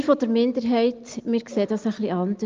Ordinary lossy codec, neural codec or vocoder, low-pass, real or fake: Opus, 32 kbps; none; 7.2 kHz; real